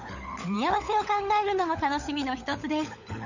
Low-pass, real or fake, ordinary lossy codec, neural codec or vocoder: 7.2 kHz; fake; none; codec, 16 kHz, 16 kbps, FunCodec, trained on LibriTTS, 50 frames a second